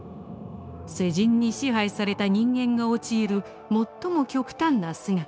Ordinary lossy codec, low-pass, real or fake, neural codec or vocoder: none; none; fake; codec, 16 kHz, 0.9 kbps, LongCat-Audio-Codec